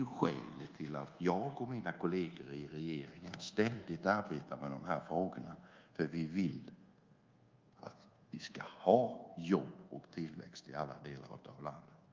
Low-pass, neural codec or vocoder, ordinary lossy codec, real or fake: 7.2 kHz; codec, 24 kHz, 1.2 kbps, DualCodec; Opus, 32 kbps; fake